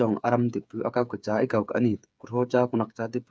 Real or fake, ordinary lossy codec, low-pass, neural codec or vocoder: fake; none; none; codec, 16 kHz, 8 kbps, FreqCodec, smaller model